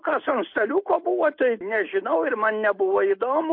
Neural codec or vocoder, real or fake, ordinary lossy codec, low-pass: none; real; MP3, 32 kbps; 5.4 kHz